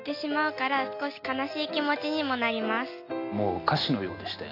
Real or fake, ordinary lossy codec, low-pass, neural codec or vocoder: real; AAC, 32 kbps; 5.4 kHz; none